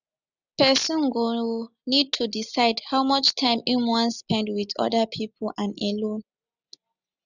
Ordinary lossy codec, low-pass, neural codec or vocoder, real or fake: none; 7.2 kHz; none; real